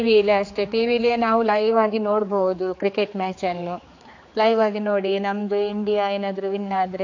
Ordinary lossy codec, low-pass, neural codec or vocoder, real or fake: AAC, 48 kbps; 7.2 kHz; codec, 16 kHz, 4 kbps, X-Codec, HuBERT features, trained on general audio; fake